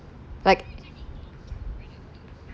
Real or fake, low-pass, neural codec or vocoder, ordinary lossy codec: real; none; none; none